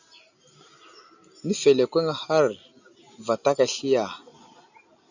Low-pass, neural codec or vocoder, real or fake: 7.2 kHz; none; real